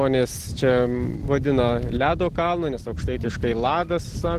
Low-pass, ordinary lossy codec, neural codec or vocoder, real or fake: 14.4 kHz; Opus, 16 kbps; none; real